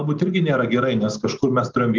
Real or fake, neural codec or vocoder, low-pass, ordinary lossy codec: real; none; 7.2 kHz; Opus, 32 kbps